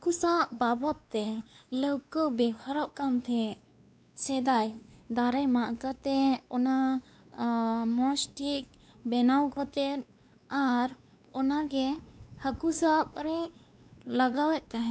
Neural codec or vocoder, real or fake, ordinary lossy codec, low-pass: codec, 16 kHz, 2 kbps, X-Codec, WavLM features, trained on Multilingual LibriSpeech; fake; none; none